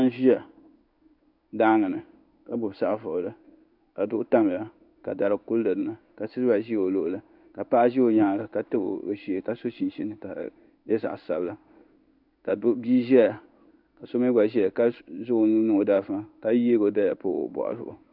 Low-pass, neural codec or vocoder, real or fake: 5.4 kHz; codec, 16 kHz in and 24 kHz out, 1 kbps, XY-Tokenizer; fake